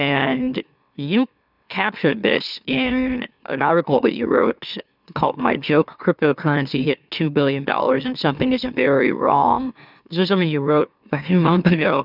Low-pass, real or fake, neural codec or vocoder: 5.4 kHz; fake; autoencoder, 44.1 kHz, a latent of 192 numbers a frame, MeloTTS